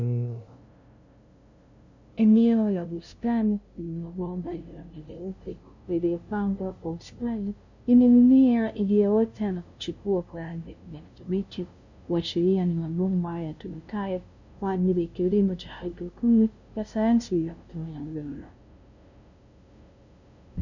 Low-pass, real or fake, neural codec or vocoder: 7.2 kHz; fake; codec, 16 kHz, 0.5 kbps, FunCodec, trained on LibriTTS, 25 frames a second